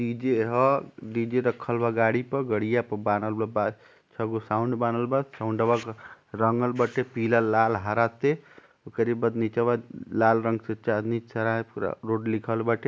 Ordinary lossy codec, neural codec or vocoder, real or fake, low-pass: none; none; real; none